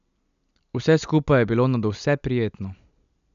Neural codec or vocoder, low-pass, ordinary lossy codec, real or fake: none; 7.2 kHz; none; real